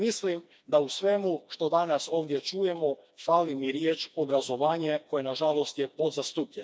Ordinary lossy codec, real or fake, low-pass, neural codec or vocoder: none; fake; none; codec, 16 kHz, 2 kbps, FreqCodec, smaller model